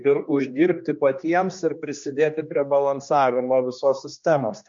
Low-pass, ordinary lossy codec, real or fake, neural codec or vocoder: 7.2 kHz; MP3, 48 kbps; fake; codec, 16 kHz, 2 kbps, X-Codec, HuBERT features, trained on general audio